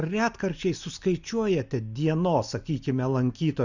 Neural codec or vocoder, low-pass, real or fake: none; 7.2 kHz; real